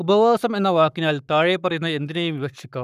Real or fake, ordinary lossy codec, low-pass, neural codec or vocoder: fake; none; 14.4 kHz; codec, 44.1 kHz, 7.8 kbps, Pupu-Codec